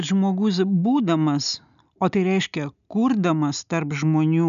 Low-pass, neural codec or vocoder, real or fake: 7.2 kHz; none; real